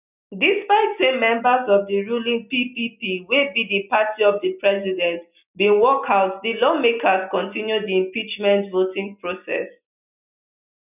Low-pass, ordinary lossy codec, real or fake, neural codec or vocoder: 3.6 kHz; none; real; none